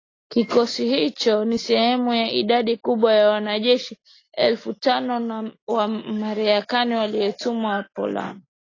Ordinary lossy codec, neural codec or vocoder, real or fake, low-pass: AAC, 32 kbps; none; real; 7.2 kHz